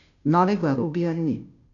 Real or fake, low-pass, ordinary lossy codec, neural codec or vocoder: fake; 7.2 kHz; none; codec, 16 kHz, 0.5 kbps, FunCodec, trained on Chinese and English, 25 frames a second